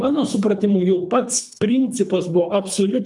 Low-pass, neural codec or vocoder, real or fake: 10.8 kHz; codec, 24 kHz, 3 kbps, HILCodec; fake